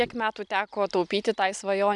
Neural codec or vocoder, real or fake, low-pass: none; real; 10.8 kHz